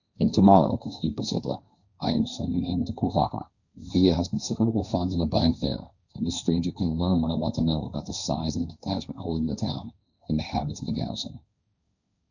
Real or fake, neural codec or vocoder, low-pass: fake; codec, 16 kHz, 1.1 kbps, Voila-Tokenizer; 7.2 kHz